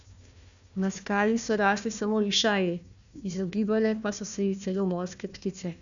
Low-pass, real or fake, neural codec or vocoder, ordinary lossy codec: 7.2 kHz; fake; codec, 16 kHz, 1 kbps, FunCodec, trained on Chinese and English, 50 frames a second; none